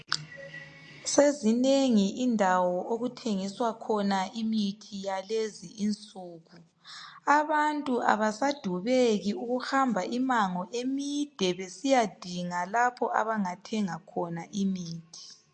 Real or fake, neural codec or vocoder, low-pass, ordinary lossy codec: real; none; 9.9 kHz; MP3, 48 kbps